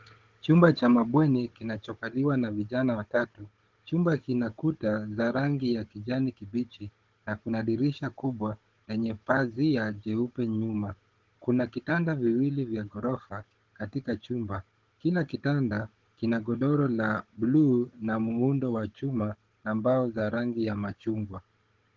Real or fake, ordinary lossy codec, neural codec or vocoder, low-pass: fake; Opus, 16 kbps; codec, 16 kHz, 16 kbps, FunCodec, trained on Chinese and English, 50 frames a second; 7.2 kHz